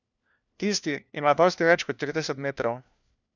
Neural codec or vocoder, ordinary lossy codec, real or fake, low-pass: codec, 16 kHz, 1 kbps, FunCodec, trained on LibriTTS, 50 frames a second; none; fake; 7.2 kHz